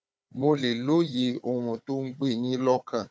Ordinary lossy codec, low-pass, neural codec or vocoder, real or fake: none; none; codec, 16 kHz, 4 kbps, FunCodec, trained on Chinese and English, 50 frames a second; fake